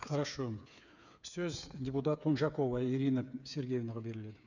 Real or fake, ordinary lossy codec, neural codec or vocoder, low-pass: fake; none; codec, 16 kHz, 8 kbps, FreqCodec, smaller model; 7.2 kHz